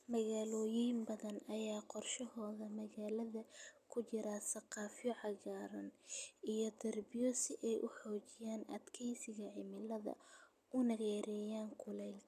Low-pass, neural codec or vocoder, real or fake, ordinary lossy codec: 14.4 kHz; none; real; none